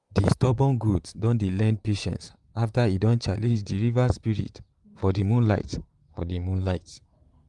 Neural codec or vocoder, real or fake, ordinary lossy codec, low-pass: vocoder, 44.1 kHz, 128 mel bands, Pupu-Vocoder; fake; Opus, 32 kbps; 10.8 kHz